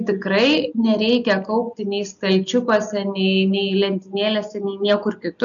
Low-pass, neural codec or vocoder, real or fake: 7.2 kHz; none; real